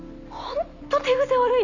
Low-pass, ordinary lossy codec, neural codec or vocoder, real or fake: 7.2 kHz; none; none; real